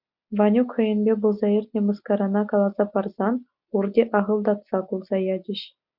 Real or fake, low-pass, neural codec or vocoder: real; 5.4 kHz; none